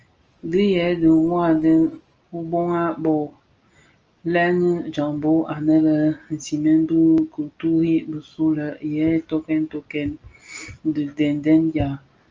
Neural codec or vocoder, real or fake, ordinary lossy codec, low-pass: none; real; Opus, 24 kbps; 7.2 kHz